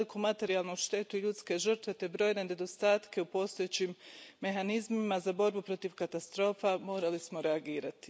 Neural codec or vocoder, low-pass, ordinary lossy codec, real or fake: none; none; none; real